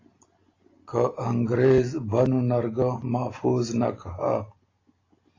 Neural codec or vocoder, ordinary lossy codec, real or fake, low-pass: none; AAC, 32 kbps; real; 7.2 kHz